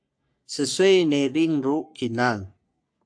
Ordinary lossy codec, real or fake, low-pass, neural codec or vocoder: AAC, 64 kbps; fake; 9.9 kHz; codec, 44.1 kHz, 3.4 kbps, Pupu-Codec